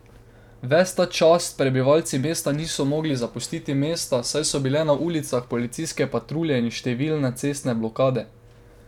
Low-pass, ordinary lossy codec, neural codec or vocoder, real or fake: 19.8 kHz; none; none; real